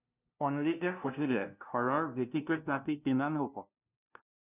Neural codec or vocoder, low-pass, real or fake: codec, 16 kHz, 0.5 kbps, FunCodec, trained on LibriTTS, 25 frames a second; 3.6 kHz; fake